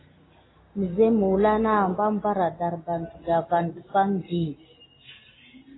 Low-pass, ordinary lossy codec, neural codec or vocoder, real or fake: 7.2 kHz; AAC, 16 kbps; none; real